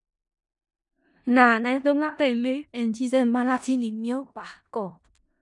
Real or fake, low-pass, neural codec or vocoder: fake; 10.8 kHz; codec, 16 kHz in and 24 kHz out, 0.4 kbps, LongCat-Audio-Codec, four codebook decoder